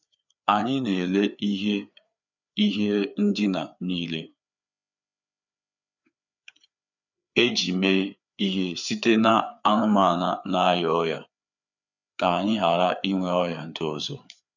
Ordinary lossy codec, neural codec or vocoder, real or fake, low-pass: none; codec, 16 kHz, 4 kbps, FreqCodec, larger model; fake; 7.2 kHz